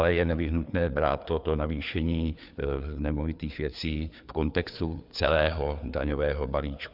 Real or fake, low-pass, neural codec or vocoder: fake; 5.4 kHz; codec, 16 kHz, 4 kbps, FreqCodec, larger model